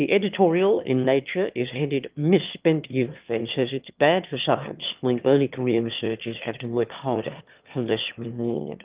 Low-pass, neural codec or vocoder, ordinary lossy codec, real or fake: 3.6 kHz; autoencoder, 22.05 kHz, a latent of 192 numbers a frame, VITS, trained on one speaker; Opus, 32 kbps; fake